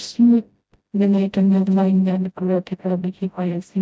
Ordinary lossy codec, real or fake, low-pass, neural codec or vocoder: none; fake; none; codec, 16 kHz, 0.5 kbps, FreqCodec, smaller model